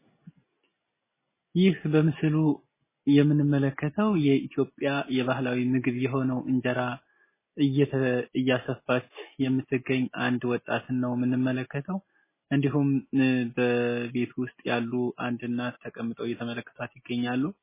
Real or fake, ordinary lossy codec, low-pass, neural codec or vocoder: real; MP3, 16 kbps; 3.6 kHz; none